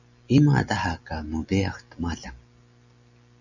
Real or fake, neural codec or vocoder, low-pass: real; none; 7.2 kHz